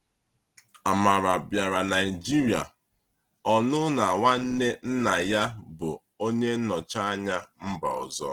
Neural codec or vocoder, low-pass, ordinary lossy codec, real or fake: none; 19.8 kHz; Opus, 16 kbps; real